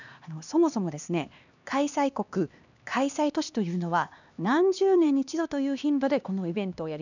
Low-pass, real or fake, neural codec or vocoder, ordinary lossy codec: 7.2 kHz; fake; codec, 16 kHz, 2 kbps, X-Codec, HuBERT features, trained on LibriSpeech; none